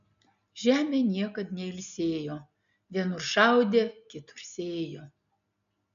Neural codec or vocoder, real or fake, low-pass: none; real; 7.2 kHz